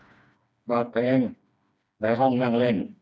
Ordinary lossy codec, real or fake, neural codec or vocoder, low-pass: none; fake; codec, 16 kHz, 2 kbps, FreqCodec, smaller model; none